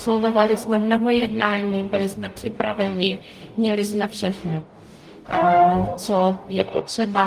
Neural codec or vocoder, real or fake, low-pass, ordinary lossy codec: codec, 44.1 kHz, 0.9 kbps, DAC; fake; 14.4 kHz; Opus, 32 kbps